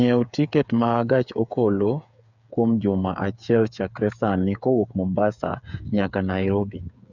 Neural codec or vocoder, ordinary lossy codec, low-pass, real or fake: codec, 16 kHz, 8 kbps, FreqCodec, smaller model; none; 7.2 kHz; fake